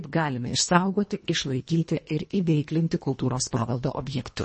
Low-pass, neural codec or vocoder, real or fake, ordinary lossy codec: 10.8 kHz; codec, 24 kHz, 1.5 kbps, HILCodec; fake; MP3, 32 kbps